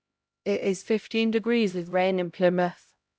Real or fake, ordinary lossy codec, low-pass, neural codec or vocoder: fake; none; none; codec, 16 kHz, 0.5 kbps, X-Codec, HuBERT features, trained on LibriSpeech